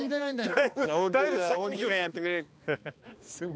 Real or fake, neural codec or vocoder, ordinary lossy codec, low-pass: fake; codec, 16 kHz, 2 kbps, X-Codec, HuBERT features, trained on balanced general audio; none; none